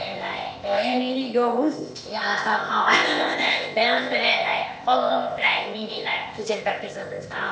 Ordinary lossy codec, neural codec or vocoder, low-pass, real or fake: none; codec, 16 kHz, 0.8 kbps, ZipCodec; none; fake